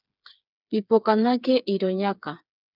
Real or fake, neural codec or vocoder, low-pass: fake; codec, 16 kHz, 4 kbps, FreqCodec, smaller model; 5.4 kHz